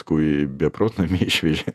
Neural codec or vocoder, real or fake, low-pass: vocoder, 48 kHz, 128 mel bands, Vocos; fake; 14.4 kHz